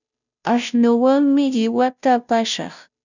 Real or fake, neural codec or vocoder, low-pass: fake; codec, 16 kHz, 0.5 kbps, FunCodec, trained on Chinese and English, 25 frames a second; 7.2 kHz